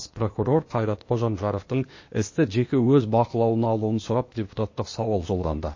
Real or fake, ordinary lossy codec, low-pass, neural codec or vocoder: fake; MP3, 32 kbps; 7.2 kHz; codec, 16 kHz, 0.8 kbps, ZipCodec